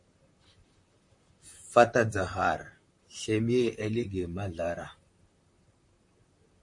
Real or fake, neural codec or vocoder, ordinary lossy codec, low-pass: fake; vocoder, 44.1 kHz, 128 mel bands, Pupu-Vocoder; MP3, 48 kbps; 10.8 kHz